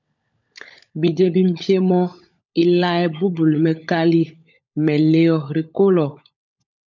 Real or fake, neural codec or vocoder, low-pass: fake; codec, 16 kHz, 16 kbps, FunCodec, trained on LibriTTS, 50 frames a second; 7.2 kHz